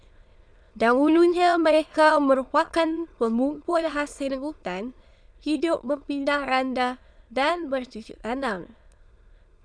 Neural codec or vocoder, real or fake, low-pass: autoencoder, 22.05 kHz, a latent of 192 numbers a frame, VITS, trained on many speakers; fake; 9.9 kHz